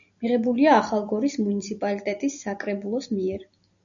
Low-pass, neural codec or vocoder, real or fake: 7.2 kHz; none; real